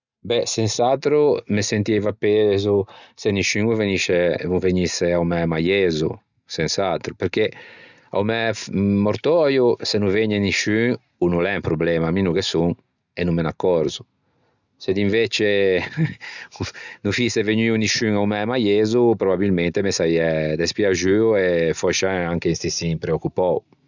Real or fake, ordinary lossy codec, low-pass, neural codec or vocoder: real; none; 7.2 kHz; none